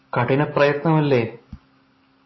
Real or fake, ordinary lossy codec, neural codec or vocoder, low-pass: real; MP3, 24 kbps; none; 7.2 kHz